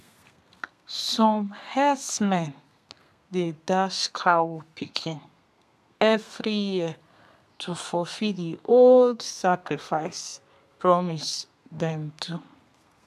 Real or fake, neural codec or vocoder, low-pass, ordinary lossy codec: fake; codec, 32 kHz, 1.9 kbps, SNAC; 14.4 kHz; none